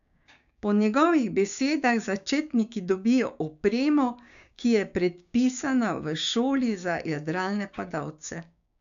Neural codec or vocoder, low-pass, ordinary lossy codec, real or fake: codec, 16 kHz, 6 kbps, DAC; 7.2 kHz; none; fake